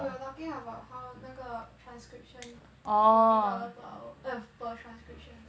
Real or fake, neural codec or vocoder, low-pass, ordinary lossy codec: real; none; none; none